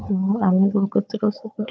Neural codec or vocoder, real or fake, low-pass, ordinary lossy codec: codec, 16 kHz, 4 kbps, FunCodec, trained on Chinese and English, 50 frames a second; fake; none; none